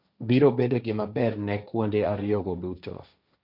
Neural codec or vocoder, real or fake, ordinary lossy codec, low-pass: codec, 16 kHz, 1.1 kbps, Voila-Tokenizer; fake; none; 5.4 kHz